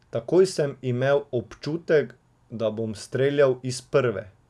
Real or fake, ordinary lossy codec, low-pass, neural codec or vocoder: real; none; none; none